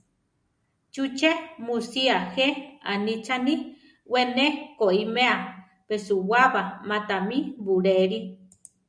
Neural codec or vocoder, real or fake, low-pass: none; real; 9.9 kHz